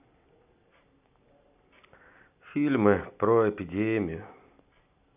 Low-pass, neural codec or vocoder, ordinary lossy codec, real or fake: 3.6 kHz; none; none; real